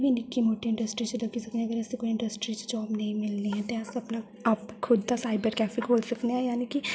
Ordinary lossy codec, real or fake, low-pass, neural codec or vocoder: none; real; none; none